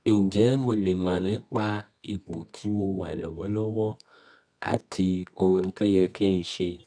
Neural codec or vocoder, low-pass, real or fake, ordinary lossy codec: codec, 24 kHz, 0.9 kbps, WavTokenizer, medium music audio release; 9.9 kHz; fake; none